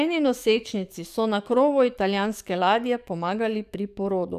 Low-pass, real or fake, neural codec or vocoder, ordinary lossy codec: 14.4 kHz; fake; codec, 44.1 kHz, 7.8 kbps, DAC; none